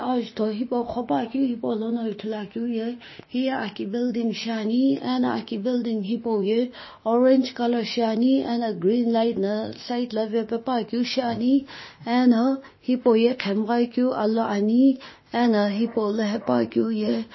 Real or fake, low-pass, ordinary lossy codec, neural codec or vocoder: fake; 7.2 kHz; MP3, 24 kbps; autoencoder, 48 kHz, 32 numbers a frame, DAC-VAE, trained on Japanese speech